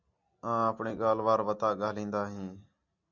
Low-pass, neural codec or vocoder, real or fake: 7.2 kHz; none; real